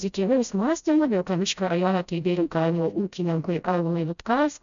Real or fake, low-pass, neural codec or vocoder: fake; 7.2 kHz; codec, 16 kHz, 0.5 kbps, FreqCodec, smaller model